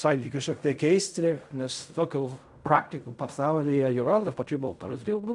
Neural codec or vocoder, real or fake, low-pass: codec, 16 kHz in and 24 kHz out, 0.4 kbps, LongCat-Audio-Codec, fine tuned four codebook decoder; fake; 10.8 kHz